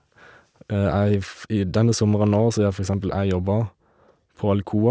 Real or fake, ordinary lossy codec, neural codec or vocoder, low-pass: real; none; none; none